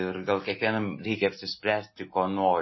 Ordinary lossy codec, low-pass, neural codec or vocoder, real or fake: MP3, 24 kbps; 7.2 kHz; none; real